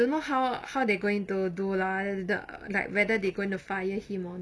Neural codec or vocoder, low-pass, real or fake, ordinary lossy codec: none; none; real; none